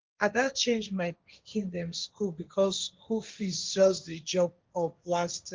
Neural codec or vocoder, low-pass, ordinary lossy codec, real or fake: codec, 16 kHz, 1.1 kbps, Voila-Tokenizer; 7.2 kHz; Opus, 32 kbps; fake